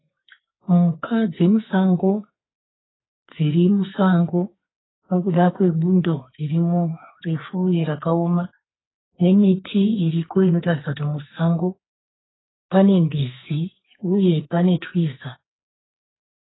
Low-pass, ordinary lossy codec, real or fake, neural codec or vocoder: 7.2 kHz; AAC, 16 kbps; fake; codec, 44.1 kHz, 2.6 kbps, SNAC